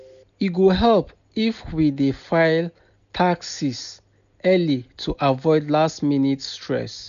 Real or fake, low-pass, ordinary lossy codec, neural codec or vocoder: real; 7.2 kHz; none; none